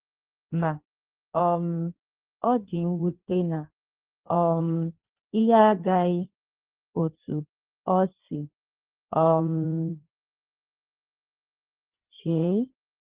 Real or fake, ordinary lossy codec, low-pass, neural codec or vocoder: fake; Opus, 16 kbps; 3.6 kHz; codec, 16 kHz in and 24 kHz out, 1.1 kbps, FireRedTTS-2 codec